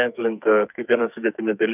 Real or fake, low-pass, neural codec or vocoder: fake; 3.6 kHz; codec, 44.1 kHz, 2.6 kbps, SNAC